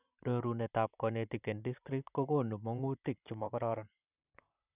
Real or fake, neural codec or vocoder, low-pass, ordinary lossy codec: fake; vocoder, 44.1 kHz, 128 mel bands, Pupu-Vocoder; 3.6 kHz; none